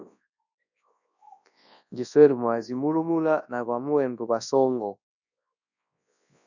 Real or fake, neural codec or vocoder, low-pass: fake; codec, 24 kHz, 0.9 kbps, WavTokenizer, large speech release; 7.2 kHz